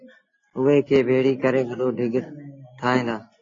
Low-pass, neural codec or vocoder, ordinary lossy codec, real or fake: 7.2 kHz; none; AAC, 32 kbps; real